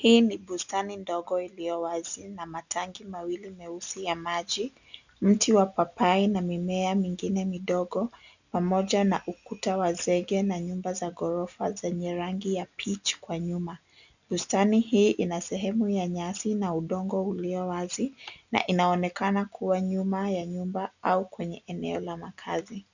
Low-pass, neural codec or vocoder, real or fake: 7.2 kHz; none; real